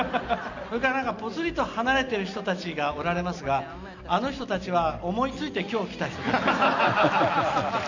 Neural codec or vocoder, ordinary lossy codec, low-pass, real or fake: none; none; 7.2 kHz; real